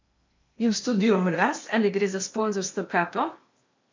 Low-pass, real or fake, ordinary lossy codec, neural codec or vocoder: 7.2 kHz; fake; MP3, 48 kbps; codec, 16 kHz in and 24 kHz out, 0.8 kbps, FocalCodec, streaming, 65536 codes